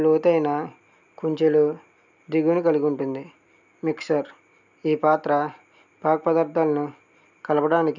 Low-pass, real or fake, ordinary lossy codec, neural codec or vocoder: 7.2 kHz; real; none; none